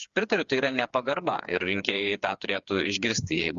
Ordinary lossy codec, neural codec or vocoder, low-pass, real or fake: Opus, 64 kbps; codec, 16 kHz, 4 kbps, FreqCodec, smaller model; 7.2 kHz; fake